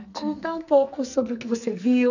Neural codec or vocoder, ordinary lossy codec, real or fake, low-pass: codec, 44.1 kHz, 2.6 kbps, SNAC; none; fake; 7.2 kHz